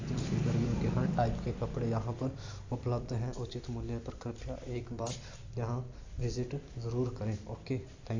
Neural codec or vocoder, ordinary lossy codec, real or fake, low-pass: vocoder, 44.1 kHz, 128 mel bands every 256 samples, BigVGAN v2; AAC, 48 kbps; fake; 7.2 kHz